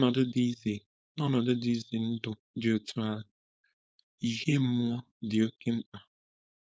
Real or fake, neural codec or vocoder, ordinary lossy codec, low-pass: fake; codec, 16 kHz, 4.8 kbps, FACodec; none; none